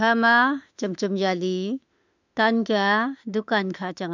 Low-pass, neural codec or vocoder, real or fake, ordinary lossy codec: 7.2 kHz; autoencoder, 48 kHz, 32 numbers a frame, DAC-VAE, trained on Japanese speech; fake; none